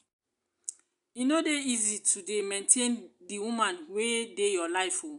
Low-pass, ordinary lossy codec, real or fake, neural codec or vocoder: 10.8 kHz; none; real; none